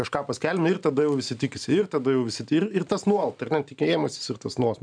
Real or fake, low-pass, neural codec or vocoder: fake; 9.9 kHz; vocoder, 44.1 kHz, 128 mel bands every 512 samples, BigVGAN v2